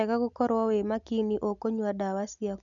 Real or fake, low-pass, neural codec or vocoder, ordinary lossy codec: real; 7.2 kHz; none; none